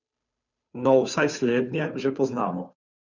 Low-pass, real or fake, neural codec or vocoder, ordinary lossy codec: 7.2 kHz; fake; codec, 16 kHz, 2 kbps, FunCodec, trained on Chinese and English, 25 frames a second; none